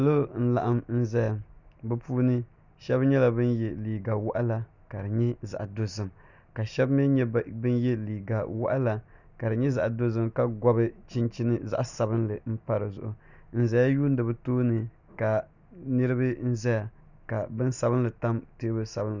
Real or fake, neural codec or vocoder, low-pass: real; none; 7.2 kHz